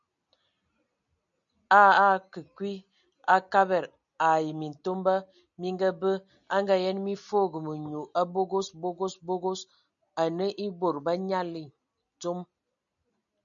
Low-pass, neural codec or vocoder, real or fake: 7.2 kHz; none; real